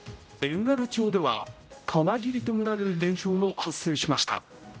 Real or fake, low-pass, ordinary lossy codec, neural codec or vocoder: fake; none; none; codec, 16 kHz, 0.5 kbps, X-Codec, HuBERT features, trained on general audio